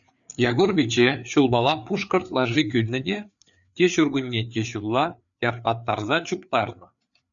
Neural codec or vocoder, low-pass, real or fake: codec, 16 kHz, 4 kbps, FreqCodec, larger model; 7.2 kHz; fake